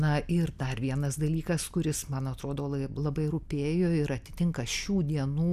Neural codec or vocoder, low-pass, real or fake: none; 14.4 kHz; real